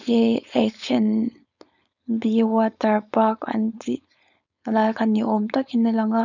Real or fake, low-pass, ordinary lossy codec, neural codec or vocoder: fake; 7.2 kHz; none; codec, 16 kHz, 4.8 kbps, FACodec